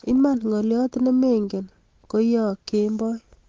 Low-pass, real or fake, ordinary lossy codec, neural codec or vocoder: 7.2 kHz; real; Opus, 16 kbps; none